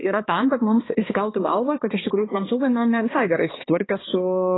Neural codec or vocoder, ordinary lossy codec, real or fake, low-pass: codec, 16 kHz, 2 kbps, X-Codec, HuBERT features, trained on balanced general audio; AAC, 16 kbps; fake; 7.2 kHz